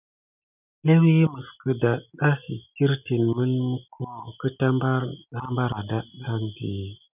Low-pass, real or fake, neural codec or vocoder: 3.6 kHz; real; none